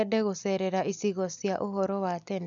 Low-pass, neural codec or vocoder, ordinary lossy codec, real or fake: 7.2 kHz; none; none; real